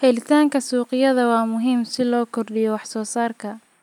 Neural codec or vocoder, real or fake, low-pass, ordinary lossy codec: none; real; 19.8 kHz; none